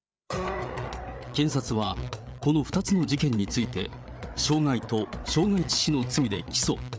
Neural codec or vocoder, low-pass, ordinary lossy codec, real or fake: codec, 16 kHz, 8 kbps, FreqCodec, larger model; none; none; fake